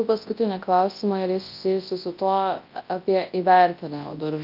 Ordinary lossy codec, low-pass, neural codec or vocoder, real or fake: Opus, 32 kbps; 5.4 kHz; codec, 24 kHz, 0.9 kbps, WavTokenizer, large speech release; fake